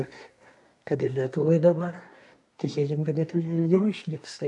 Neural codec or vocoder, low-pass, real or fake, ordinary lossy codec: codec, 24 kHz, 1 kbps, SNAC; 10.8 kHz; fake; AAC, 64 kbps